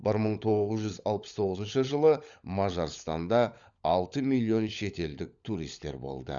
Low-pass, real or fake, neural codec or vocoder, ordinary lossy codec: 7.2 kHz; fake; codec, 16 kHz, 16 kbps, FunCodec, trained on LibriTTS, 50 frames a second; Opus, 64 kbps